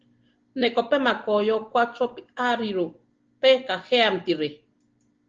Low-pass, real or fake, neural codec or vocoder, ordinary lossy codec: 7.2 kHz; real; none; Opus, 16 kbps